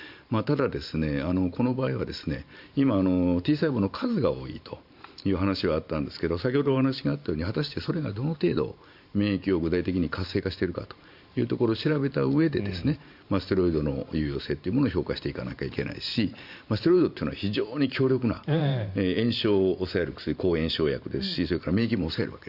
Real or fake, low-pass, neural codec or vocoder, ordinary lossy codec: fake; 5.4 kHz; vocoder, 44.1 kHz, 128 mel bands every 512 samples, BigVGAN v2; Opus, 64 kbps